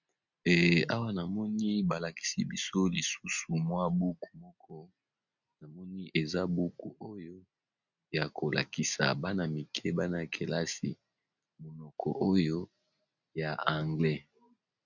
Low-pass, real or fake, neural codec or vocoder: 7.2 kHz; real; none